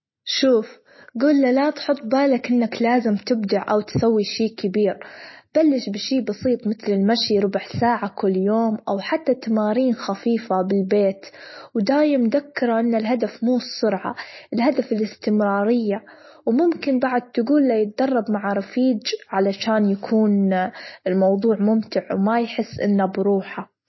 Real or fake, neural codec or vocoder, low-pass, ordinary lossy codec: real; none; 7.2 kHz; MP3, 24 kbps